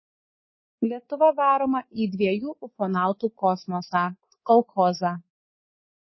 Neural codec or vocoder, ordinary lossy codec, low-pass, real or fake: codec, 24 kHz, 3.1 kbps, DualCodec; MP3, 24 kbps; 7.2 kHz; fake